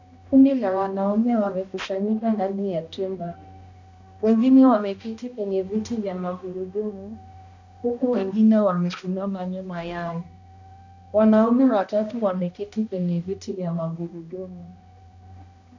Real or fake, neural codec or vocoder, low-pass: fake; codec, 16 kHz, 1 kbps, X-Codec, HuBERT features, trained on balanced general audio; 7.2 kHz